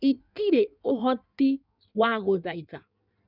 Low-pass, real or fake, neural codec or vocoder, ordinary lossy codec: 5.4 kHz; fake; codec, 16 kHz in and 24 kHz out, 1.1 kbps, FireRedTTS-2 codec; none